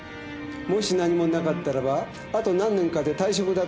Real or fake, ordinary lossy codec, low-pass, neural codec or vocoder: real; none; none; none